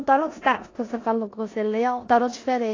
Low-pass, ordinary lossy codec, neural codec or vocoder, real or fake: 7.2 kHz; AAC, 32 kbps; codec, 16 kHz in and 24 kHz out, 0.9 kbps, LongCat-Audio-Codec, four codebook decoder; fake